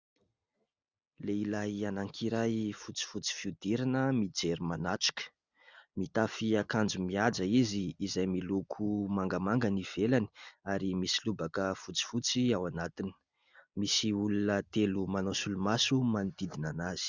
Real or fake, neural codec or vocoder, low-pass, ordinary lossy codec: real; none; 7.2 kHz; Opus, 64 kbps